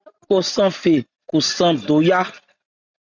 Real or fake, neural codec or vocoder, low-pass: real; none; 7.2 kHz